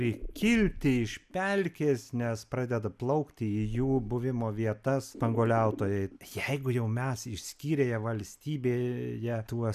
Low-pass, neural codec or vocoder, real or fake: 14.4 kHz; none; real